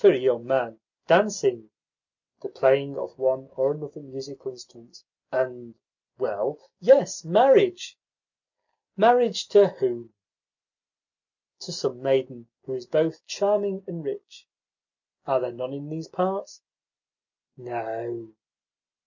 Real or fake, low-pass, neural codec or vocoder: real; 7.2 kHz; none